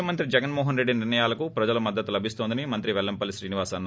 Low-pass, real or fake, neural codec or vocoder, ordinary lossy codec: none; real; none; none